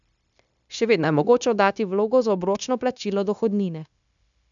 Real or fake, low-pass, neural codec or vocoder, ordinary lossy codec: fake; 7.2 kHz; codec, 16 kHz, 0.9 kbps, LongCat-Audio-Codec; none